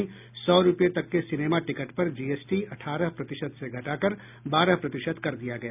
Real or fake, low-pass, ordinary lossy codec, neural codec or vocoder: real; 3.6 kHz; none; none